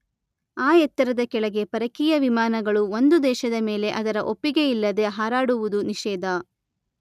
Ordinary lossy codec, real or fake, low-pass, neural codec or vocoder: none; real; 14.4 kHz; none